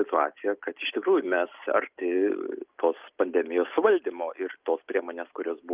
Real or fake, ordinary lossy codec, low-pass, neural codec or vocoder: real; Opus, 32 kbps; 3.6 kHz; none